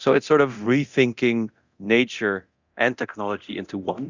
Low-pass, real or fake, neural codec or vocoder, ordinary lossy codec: 7.2 kHz; fake; codec, 24 kHz, 0.9 kbps, DualCodec; Opus, 64 kbps